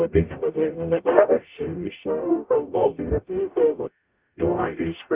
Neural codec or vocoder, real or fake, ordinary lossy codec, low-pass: codec, 44.1 kHz, 0.9 kbps, DAC; fake; Opus, 24 kbps; 3.6 kHz